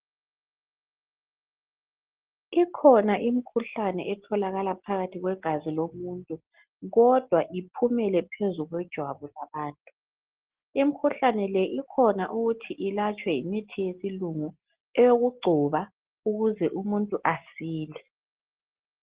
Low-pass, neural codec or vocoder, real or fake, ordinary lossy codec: 3.6 kHz; none; real; Opus, 16 kbps